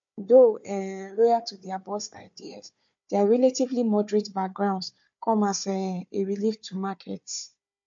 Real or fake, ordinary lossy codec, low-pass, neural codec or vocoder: fake; MP3, 48 kbps; 7.2 kHz; codec, 16 kHz, 4 kbps, FunCodec, trained on Chinese and English, 50 frames a second